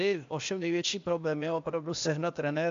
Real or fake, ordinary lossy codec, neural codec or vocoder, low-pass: fake; MP3, 64 kbps; codec, 16 kHz, 0.8 kbps, ZipCodec; 7.2 kHz